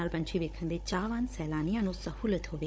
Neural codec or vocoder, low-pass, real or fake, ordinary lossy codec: codec, 16 kHz, 16 kbps, FunCodec, trained on Chinese and English, 50 frames a second; none; fake; none